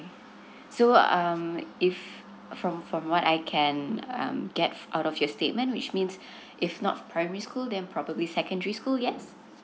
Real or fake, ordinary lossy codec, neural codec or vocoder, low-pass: real; none; none; none